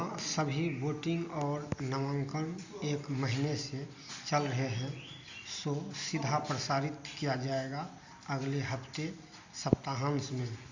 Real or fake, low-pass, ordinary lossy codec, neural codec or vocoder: real; 7.2 kHz; Opus, 64 kbps; none